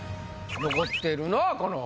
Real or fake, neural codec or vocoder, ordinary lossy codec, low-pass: real; none; none; none